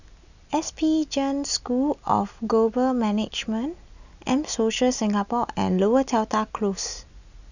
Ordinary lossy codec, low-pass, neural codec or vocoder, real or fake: none; 7.2 kHz; none; real